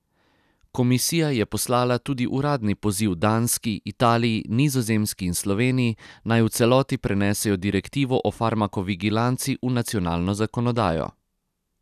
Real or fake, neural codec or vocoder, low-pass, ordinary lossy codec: real; none; 14.4 kHz; none